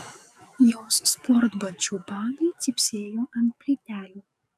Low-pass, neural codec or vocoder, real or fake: 14.4 kHz; codec, 44.1 kHz, 7.8 kbps, DAC; fake